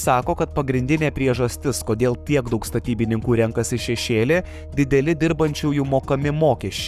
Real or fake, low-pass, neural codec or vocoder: fake; 14.4 kHz; codec, 44.1 kHz, 7.8 kbps, Pupu-Codec